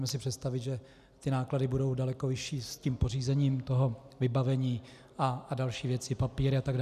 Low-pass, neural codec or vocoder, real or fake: 14.4 kHz; none; real